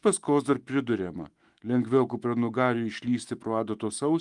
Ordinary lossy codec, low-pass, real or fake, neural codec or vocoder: Opus, 24 kbps; 10.8 kHz; fake; autoencoder, 48 kHz, 128 numbers a frame, DAC-VAE, trained on Japanese speech